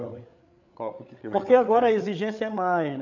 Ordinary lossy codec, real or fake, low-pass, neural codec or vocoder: none; fake; 7.2 kHz; codec, 16 kHz, 8 kbps, FreqCodec, larger model